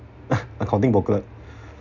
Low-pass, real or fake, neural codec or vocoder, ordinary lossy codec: 7.2 kHz; real; none; none